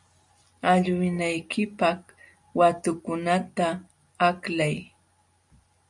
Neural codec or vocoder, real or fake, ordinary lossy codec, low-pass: none; real; MP3, 64 kbps; 10.8 kHz